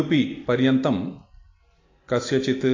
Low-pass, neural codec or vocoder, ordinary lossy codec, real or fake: 7.2 kHz; none; AAC, 32 kbps; real